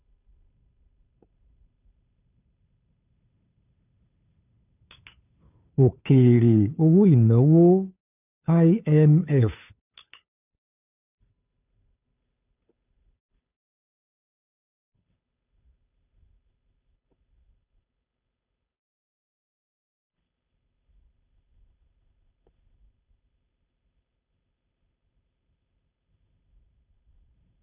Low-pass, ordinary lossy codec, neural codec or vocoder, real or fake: 3.6 kHz; none; codec, 16 kHz, 2 kbps, FunCodec, trained on Chinese and English, 25 frames a second; fake